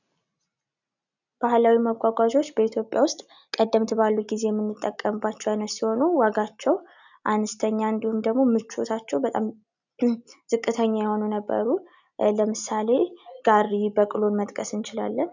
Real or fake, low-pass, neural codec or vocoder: real; 7.2 kHz; none